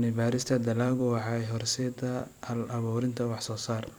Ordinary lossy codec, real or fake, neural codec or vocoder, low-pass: none; fake; vocoder, 44.1 kHz, 128 mel bands every 256 samples, BigVGAN v2; none